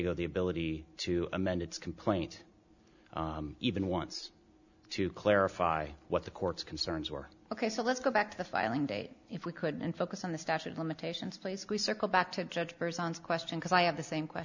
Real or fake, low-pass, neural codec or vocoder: real; 7.2 kHz; none